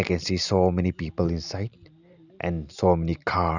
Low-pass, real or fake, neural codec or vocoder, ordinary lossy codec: 7.2 kHz; real; none; none